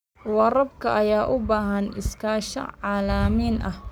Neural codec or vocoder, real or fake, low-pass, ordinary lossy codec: codec, 44.1 kHz, 7.8 kbps, Pupu-Codec; fake; none; none